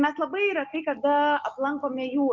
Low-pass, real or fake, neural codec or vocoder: 7.2 kHz; real; none